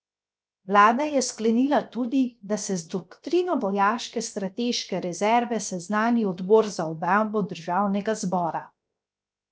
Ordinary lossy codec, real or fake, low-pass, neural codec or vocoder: none; fake; none; codec, 16 kHz, 0.7 kbps, FocalCodec